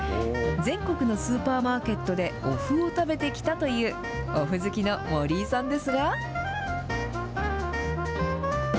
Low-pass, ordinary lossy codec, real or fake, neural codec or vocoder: none; none; real; none